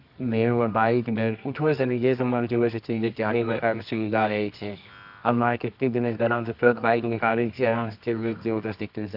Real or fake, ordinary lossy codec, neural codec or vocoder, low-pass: fake; none; codec, 24 kHz, 0.9 kbps, WavTokenizer, medium music audio release; 5.4 kHz